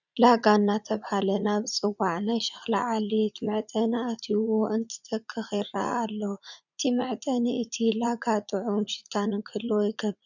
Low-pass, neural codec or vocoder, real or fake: 7.2 kHz; vocoder, 44.1 kHz, 80 mel bands, Vocos; fake